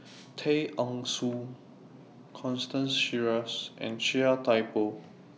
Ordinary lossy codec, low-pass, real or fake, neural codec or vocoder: none; none; real; none